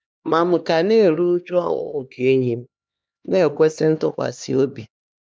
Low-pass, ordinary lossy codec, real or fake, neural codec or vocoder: none; none; fake; codec, 16 kHz, 2 kbps, X-Codec, HuBERT features, trained on LibriSpeech